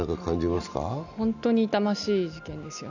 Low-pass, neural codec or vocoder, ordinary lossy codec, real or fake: 7.2 kHz; none; none; real